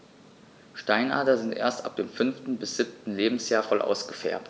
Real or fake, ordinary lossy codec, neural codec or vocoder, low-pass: real; none; none; none